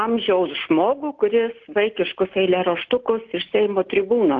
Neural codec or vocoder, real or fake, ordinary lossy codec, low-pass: none; real; Opus, 32 kbps; 7.2 kHz